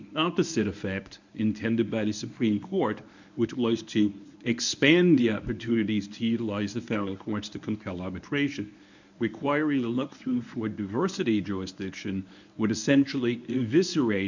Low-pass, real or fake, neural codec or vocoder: 7.2 kHz; fake; codec, 24 kHz, 0.9 kbps, WavTokenizer, medium speech release version 1